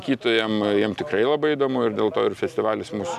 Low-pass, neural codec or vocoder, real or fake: 14.4 kHz; none; real